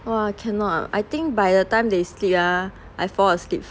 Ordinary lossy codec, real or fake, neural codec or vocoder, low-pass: none; real; none; none